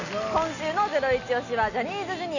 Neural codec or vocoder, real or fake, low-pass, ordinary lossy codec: none; real; 7.2 kHz; none